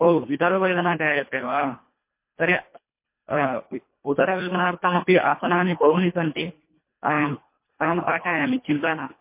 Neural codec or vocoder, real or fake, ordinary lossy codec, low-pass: codec, 24 kHz, 1.5 kbps, HILCodec; fake; MP3, 24 kbps; 3.6 kHz